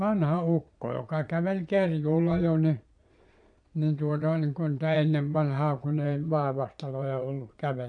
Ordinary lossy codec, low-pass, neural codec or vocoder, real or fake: none; 9.9 kHz; vocoder, 22.05 kHz, 80 mel bands, Vocos; fake